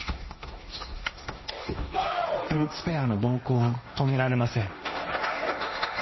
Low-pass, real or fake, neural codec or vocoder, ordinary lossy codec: 7.2 kHz; fake; codec, 16 kHz, 1.1 kbps, Voila-Tokenizer; MP3, 24 kbps